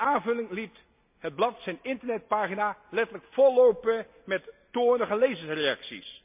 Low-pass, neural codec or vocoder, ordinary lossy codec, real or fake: 3.6 kHz; none; MP3, 32 kbps; real